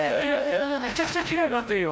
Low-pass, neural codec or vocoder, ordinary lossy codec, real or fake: none; codec, 16 kHz, 0.5 kbps, FreqCodec, larger model; none; fake